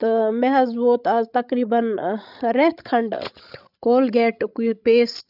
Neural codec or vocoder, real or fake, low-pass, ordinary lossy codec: codec, 16 kHz, 16 kbps, FreqCodec, larger model; fake; 5.4 kHz; none